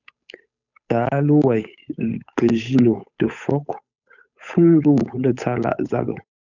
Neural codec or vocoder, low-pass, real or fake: codec, 16 kHz, 8 kbps, FunCodec, trained on Chinese and English, 25 frames a second; 7.2 kHz; fake